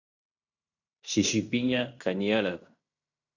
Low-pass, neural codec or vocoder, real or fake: 7.2 kHz; codec, 16 kHz in and 24 kHz out, 0.9 kbps, LongCat-Audio-Codec, fine tuned four codebook decoder; fake